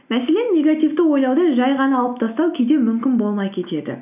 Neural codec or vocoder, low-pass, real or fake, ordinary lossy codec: none; 3.6 kHz; real; none